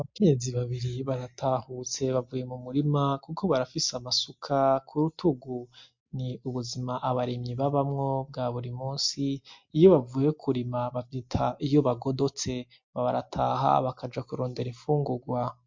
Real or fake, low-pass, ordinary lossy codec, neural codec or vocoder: real; 7.2 kHz; MP3, 48 kbps; none